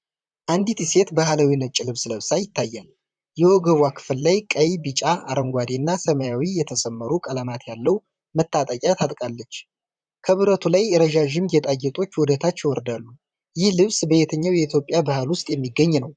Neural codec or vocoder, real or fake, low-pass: vocoder, 44.1 kHz, 128 mel bands, Pupu-Vocoder; fake; 9.9 kHz